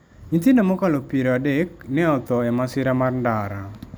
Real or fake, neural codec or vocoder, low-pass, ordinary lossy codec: real; none; none; none